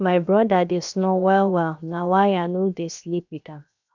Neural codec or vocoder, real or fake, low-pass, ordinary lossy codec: codec, 16 kHz, 0.7 kbps, FocalCodec; fake; 7.2 kHz; none